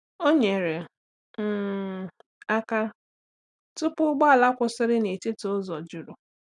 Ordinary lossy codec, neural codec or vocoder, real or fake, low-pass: none; none; real; 10.8 kHz